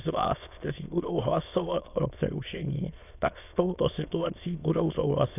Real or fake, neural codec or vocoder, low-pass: fake; autoencoder, 22.05 kHz, a latent of 192 numbers a frame, VITS, trained on many speakers; 3.6 kHz